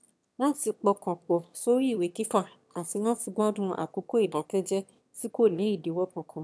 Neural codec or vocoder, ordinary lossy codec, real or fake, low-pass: autoencoder, 22.05 kHz, a latent of 192 numbers a frame, VITS, trained on one speaker; none; fake; none